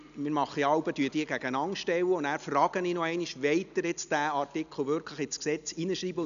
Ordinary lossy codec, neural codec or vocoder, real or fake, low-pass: none; none; real; 7.2 kHz